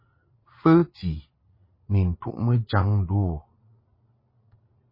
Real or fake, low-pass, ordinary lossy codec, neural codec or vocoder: fake; 5.4 kHz; MP3, 24 kbps; vocoder, 22.05 kHz, 80 mel bands, Vocos